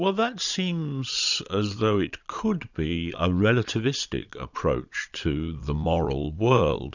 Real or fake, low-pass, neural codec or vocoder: fake; 7.2 kHz; vocoder, 22.05 kHz, 80 mel bands, Vocos